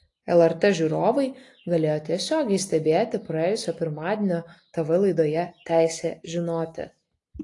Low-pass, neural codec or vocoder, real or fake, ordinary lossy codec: 10.8 kHz; none; real; AAC, 48 kbps